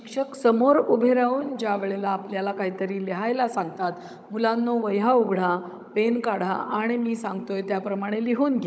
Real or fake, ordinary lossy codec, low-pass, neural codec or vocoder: fake; none; none; codec, 16 kHz, 16 kbps, FunCodec, trained on Chinese and English, 50 frames a second